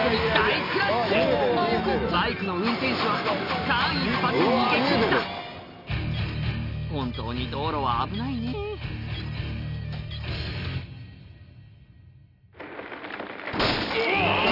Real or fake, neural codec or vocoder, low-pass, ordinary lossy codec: real; none; 5.4 kHz; none